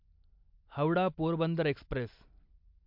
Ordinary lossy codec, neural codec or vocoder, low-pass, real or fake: MP3, 48 kbps; none; 5.4 kHz; real